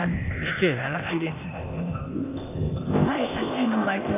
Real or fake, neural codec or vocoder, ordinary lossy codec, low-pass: fake; codec, 16 kHz, 0.8 kbps, ZipCodec; none; 3.6 kHz